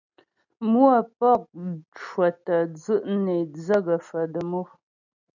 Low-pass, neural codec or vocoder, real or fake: 7.2 kHz; none; real